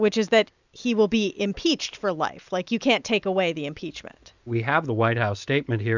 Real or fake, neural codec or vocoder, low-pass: real; none; 7.2 kHz